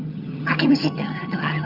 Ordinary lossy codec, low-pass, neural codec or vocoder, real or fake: Opus, 64 kbps; 5.4 kHz; vocoder, 22.05 kHz, 80 mel bands, HiFi-GAN; fake